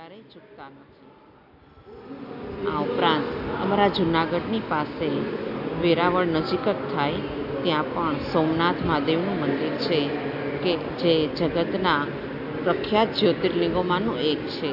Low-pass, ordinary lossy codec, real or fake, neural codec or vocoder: 5.4 kHz; none; real; none